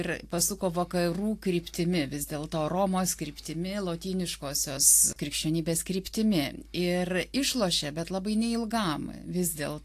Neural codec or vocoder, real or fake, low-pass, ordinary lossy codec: none; real; 14.4 kHz; AAC, 64 kbps